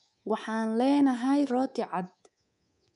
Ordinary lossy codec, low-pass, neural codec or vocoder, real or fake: none; 10.8 kHz; codec, 24 kHz, 3.1 kbps, DualCodec; fake